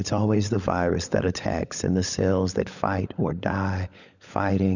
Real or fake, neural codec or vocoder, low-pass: fake; codec, 16 kHz, 16 kbps, FunCodec, trained on LibriTTS, 50 frames a second; 7.2 kHz